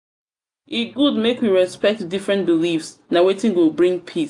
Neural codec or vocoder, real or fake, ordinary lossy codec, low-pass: none; real; AAC, 64 kbps; 10.8 kHz